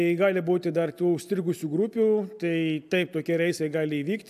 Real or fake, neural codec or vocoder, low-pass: real; none; 14.4 kHz